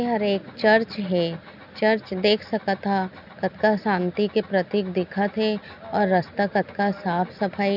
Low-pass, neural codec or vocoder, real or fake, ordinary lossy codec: 5.4 kHz; none; real; none